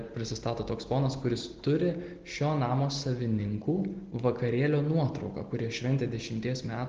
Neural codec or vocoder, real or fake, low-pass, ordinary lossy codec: none; real; 7.2 kHz; Opus, 16 kbps